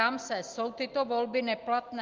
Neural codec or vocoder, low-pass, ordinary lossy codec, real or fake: none; 7.2 kHz; Opus, 24 kbps; real